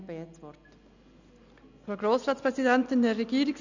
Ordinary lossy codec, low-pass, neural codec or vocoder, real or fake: none; 7.2 kHz; none; real